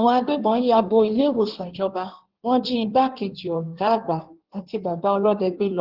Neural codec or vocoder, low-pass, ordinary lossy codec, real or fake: codec, 16 kHz in and 24 kHz out, 1.1 kbps, FireRedTTS-2 codec; 5.4 kHz; Opus, 16 kbps; fake